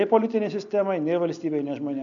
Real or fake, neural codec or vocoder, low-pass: real; none; 7.2 kHz